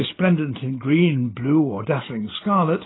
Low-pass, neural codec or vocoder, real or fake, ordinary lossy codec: 7.2 kHz; none; real; AAC, 16 kbps